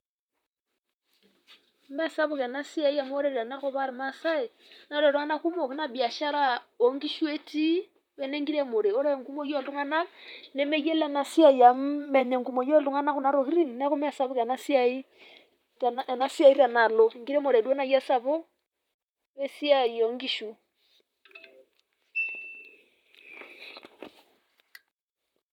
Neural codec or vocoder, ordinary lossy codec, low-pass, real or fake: vocoder, 44.1 kHz, 128 mel bands, Pupu-Vocoder; none; 19.8 kHz; fake